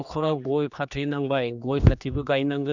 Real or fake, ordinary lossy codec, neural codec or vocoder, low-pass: fake; none; codec, 16 kHz, 2 kbps, X-Codec, HuBERT features, trained on general audio; 7.2 kHz